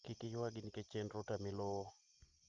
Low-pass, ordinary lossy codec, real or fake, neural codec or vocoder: 7.2 kHz; Opus, 32 kbps; real; none